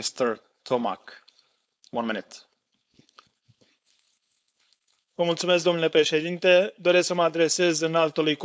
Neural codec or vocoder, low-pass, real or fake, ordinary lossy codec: codec, 16 kHz, 4.8 kbps, FACodec; none; fake; none